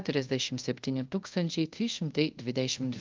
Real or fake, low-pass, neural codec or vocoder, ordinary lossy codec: fake; 7.2 kHz; codec, 24 kHz, 0.5 kbps, DualCodec; Opus, 32 kbps